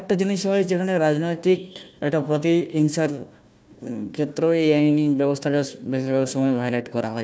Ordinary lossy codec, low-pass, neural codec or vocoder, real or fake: none; none; codec, 16 kHz, 1 kbps, FunCodec, trained on Chinese and English, 50 frames a second; fake